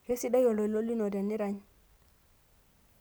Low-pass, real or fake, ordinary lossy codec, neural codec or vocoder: none; real; none; none